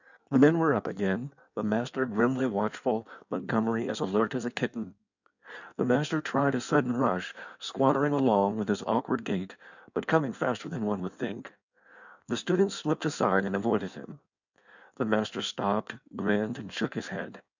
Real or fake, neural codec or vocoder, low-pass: fake; codec, 16 kHz in and 24 kHz out, 1.1 kbps, FireRedTTS-2 codec; 7.2 kHz